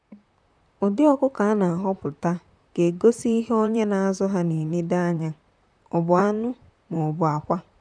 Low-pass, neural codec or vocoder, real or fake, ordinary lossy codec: 9.9 kHz; vocoder, 44.1 kHz, 128 mel bands, Pupu-Vocoder; fake; none